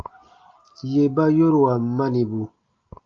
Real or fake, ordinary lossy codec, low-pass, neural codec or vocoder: real; Opus, 24 kbps; 7.2 kHz; none